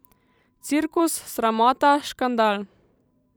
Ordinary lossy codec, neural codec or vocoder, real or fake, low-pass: none; vocoder, 44.1 kHz, 128 mel bands every 256 samples, BigVGAN v2; fake; none